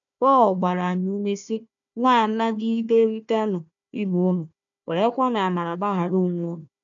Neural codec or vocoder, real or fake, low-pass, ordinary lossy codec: codec, 16 kHz, 1 kbps, FunCodec, trained on Chinese and English, 50 frames a second; fake; 7.2 kHz; none